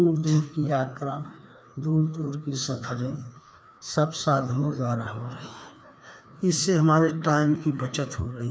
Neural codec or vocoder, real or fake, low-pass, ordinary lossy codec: codec, 16 kHz, 2 kbps, FreqCodec, larger model; fake; none; none